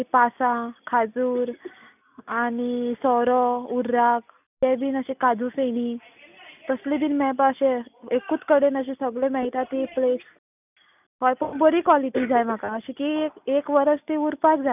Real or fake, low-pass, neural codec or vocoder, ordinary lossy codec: real; 3.6 kHz; none; none